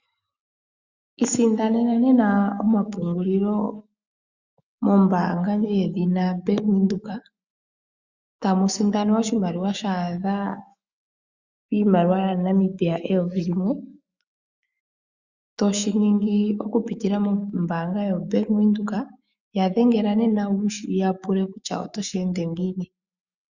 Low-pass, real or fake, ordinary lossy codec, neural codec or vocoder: 7.2 kHz; fake; Opus, 64 kbps; autoencoder, 48 kHz, 128 numbers a frame, DAC-VAE, trained on Japanese speech